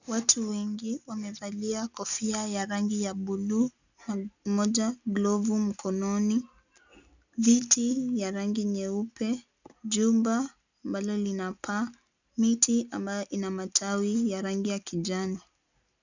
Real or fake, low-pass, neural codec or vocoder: real; 7.2 kHz; none